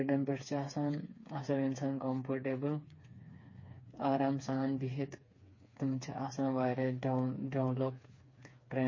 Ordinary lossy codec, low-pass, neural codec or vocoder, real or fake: MP3, 32 kbps; 7.2 kHz; codec, 16 kHz, 4 kbps, FreqCodec, smaller model; fake